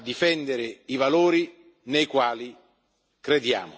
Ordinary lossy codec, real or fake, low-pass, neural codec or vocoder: none; real; none; none